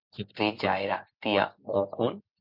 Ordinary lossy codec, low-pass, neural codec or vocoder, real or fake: MP3, 48 kbps; 5.4 kHz; none; real